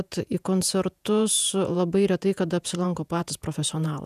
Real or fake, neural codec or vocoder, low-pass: fake; vocoder, 48 kHz, 128 mel bands, Vocos; 14.4 kHz